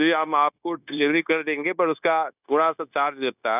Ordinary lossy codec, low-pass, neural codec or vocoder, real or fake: none; 3.6 kHz; codec, 16 kHz, 0.9 kbps, LongCat-Audio-Codec; fake